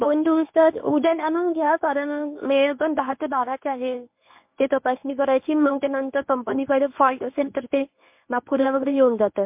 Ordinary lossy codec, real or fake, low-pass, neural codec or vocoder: MP3, 32 kbps; fake; 3.6 kHz; codec, 24 kHz, 0.9 kbps, WavTokenizer, medium speech release version 1